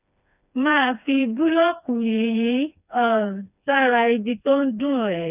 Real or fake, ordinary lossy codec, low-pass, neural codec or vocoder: fake; none; 3.6 kHz; codec, 16 kHz, 2 kbps, FreqCodec, smaller model